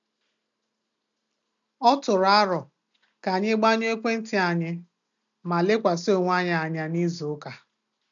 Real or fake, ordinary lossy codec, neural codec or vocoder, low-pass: real; none; none; 7.2 kHz